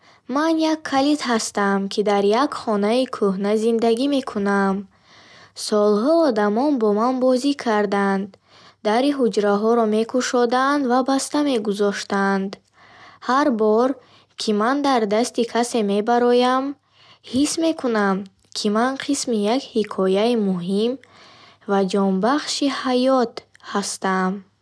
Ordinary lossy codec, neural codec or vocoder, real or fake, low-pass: none; none; real; none